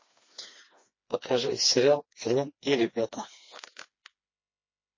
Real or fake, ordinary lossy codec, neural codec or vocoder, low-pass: fake; MP3, 32 kbps; codec, 16 kHz, 2 kbps, FreqCodec, smaller model; 7.2 kHz